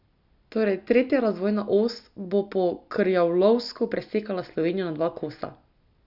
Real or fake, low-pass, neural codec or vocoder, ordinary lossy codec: real; 5.4 kHz; none; none